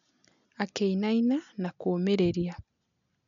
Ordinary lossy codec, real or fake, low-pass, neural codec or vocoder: none; real; 7.2 kHz; none